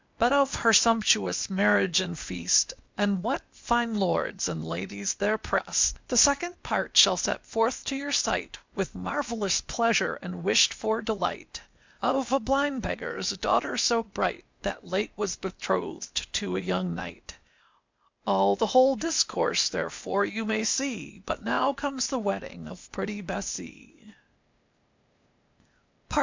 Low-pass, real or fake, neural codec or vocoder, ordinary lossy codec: 7.2 kHz; fake; codec, 16 kHz, 0.8 kbps, ZipCodec; MP3, 64 kbps